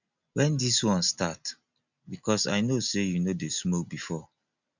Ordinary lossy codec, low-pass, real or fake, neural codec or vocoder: none; 7.2 kHz; real; none